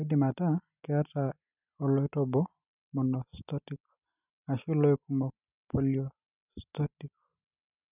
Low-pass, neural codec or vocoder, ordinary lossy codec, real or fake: 3.6 kHz; none; none; real